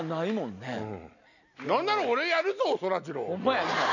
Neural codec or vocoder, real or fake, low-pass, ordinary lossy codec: none; real; 7.2 kHz; none